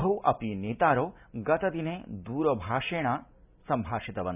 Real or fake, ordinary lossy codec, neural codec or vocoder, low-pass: real; none; none; 3.6 kHz